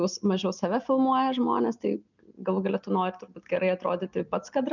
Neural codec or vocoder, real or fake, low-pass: none; real; 7.2 kHz